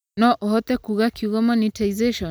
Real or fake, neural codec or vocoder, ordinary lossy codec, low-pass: real; none; none; none